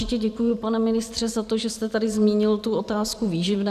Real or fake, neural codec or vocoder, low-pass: fake; vocoder, 44.1 kHz, 128 mel bands every 512 samples, BigVGAN v2; 14.4 kHz